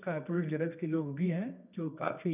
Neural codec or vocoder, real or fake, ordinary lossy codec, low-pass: codec, 24 kHz, 0.9 kbps, WavTokenizer, medium music audio release; fake; none; 3.6 kHz